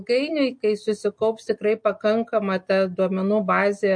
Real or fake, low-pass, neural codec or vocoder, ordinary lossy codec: real; 9.9 kHz; none; MP3, 48 kbps